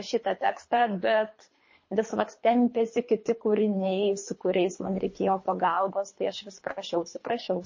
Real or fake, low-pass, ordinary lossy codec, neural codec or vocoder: fake; 7.2 kHz; MP3, 32 kbps; codec, 24 kHz, 3 kbps, HILCodec